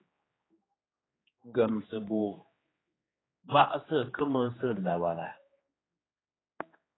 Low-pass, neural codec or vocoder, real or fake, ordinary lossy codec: 7.2 kHz; codec, 16 kHz, 2 kbps, X-Codec, HuBERT features, trained on general audio; fake; AAC, 16 kbps